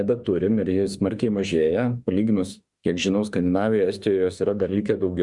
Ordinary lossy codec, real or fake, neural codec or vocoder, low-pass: Opus, 64 kbps; fake; autoencoder, 48 kHz, 32 numbers a frame, DAC-VAE, trained on Japanese speech; 10.8 kHz